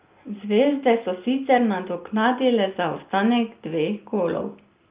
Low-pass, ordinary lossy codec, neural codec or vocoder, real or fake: 3.6 kHz; Opus, 24 kbps; none; real